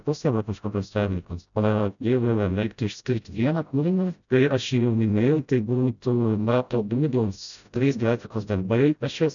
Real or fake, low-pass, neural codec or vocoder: fake; 7.2 kHz; codec, 16 kHz, 0.5 kbps, FreqCodec, smaller model